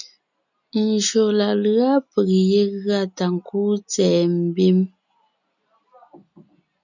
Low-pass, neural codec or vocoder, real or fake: 7.2 kHz; none; real